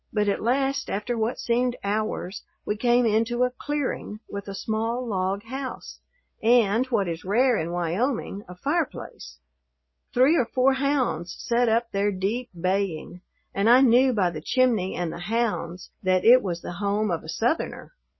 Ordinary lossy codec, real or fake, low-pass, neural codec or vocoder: MP3, 24 kbps; real; 7.2 kHz; none